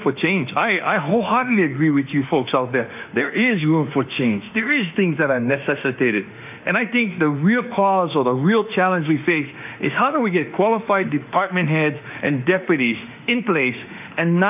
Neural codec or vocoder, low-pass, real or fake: autoencoder, 48 kHz, 32 numbers a frame, DAC-VAE, trained on Japanese speech; 3.6 kHz; fake